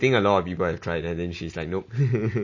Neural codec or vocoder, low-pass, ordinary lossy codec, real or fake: none; 7.2 kHz; MP3, 32 kbps; real